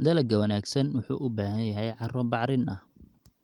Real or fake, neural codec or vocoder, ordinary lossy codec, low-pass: real; none; Opus, 24 kbps; 19.8 kHz